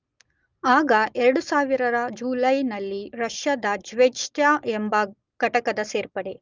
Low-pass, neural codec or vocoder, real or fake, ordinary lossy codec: 7.2 kHz; none; real; Opus, 24 kbps